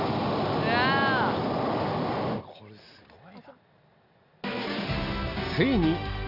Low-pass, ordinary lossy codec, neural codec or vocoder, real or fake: 5.4 kHz; none; none; real